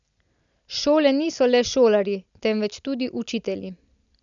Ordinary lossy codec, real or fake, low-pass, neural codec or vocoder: none; real; 7.2 kHz; none